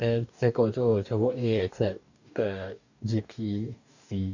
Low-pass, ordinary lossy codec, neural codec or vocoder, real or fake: 7.2 kHz; none; codec, 44.1 kHz, 2.6 kbps, DAC; fake